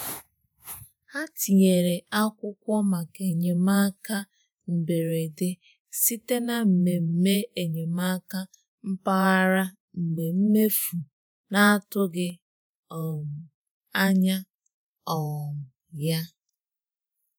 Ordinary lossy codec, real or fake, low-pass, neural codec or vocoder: none; fake; none; vocoder, 48 kHz, 128 mel bands, Vocos